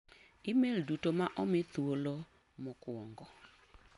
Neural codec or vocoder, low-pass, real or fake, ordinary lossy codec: none; 10.8 kHz; real; none